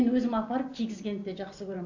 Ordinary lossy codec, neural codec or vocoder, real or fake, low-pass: AAC, 48 kbps; none; real; 7.2 kHz